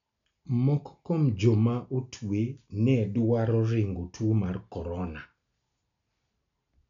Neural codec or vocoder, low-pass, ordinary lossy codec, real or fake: none; 7.2 kHz; none; real